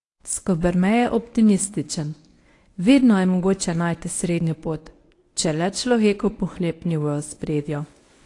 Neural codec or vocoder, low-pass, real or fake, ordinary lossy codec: codec, 24 kHz, 0.9 kbps, WavTokenizer, medium speech release version 1; 10.8 kHz; fake; AAC, 48 kbps